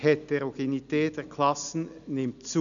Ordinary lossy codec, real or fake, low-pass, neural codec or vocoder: none; real; 7.2 kHz; none